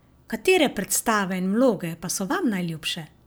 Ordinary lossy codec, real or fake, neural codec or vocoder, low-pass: none; real; none; none